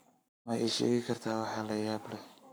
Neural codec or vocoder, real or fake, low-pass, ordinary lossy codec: codec, 44.1 kHz, 7.8 kbps, Pupu-Codec; fake; none; none